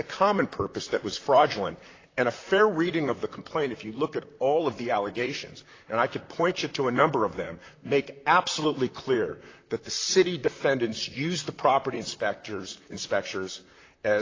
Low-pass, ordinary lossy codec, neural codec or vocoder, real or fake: 7.2 kHz; AAC, 32 kbps; vocoder, 44.1 kHz, 128 mel bands, Pupu-Vocoder; fake